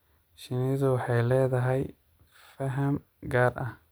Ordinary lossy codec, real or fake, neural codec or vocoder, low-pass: none; real; none; none